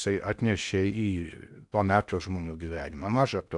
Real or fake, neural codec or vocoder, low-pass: fake; codec, 16 kHz in and 24 kHz out, 0.8 kbps, FocalCodec, streaming, 65536 codes; 10.8 kHz